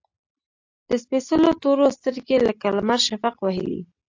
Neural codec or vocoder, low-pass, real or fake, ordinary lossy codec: none; 7.2 kHz; real; AAC, 48 kbps